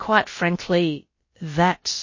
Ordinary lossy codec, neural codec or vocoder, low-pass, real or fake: MP3, 32 kbps; codec, 16 kHz, about 1 kbps, DyCAST, with the encoder's durations; 7.2 kHz; fake